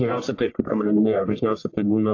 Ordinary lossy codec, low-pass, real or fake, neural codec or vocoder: AAC, 48 kbps; 7.2 kHz; fake; codec, 44.1 kHz, 1.7 kbps, Pupu-Codec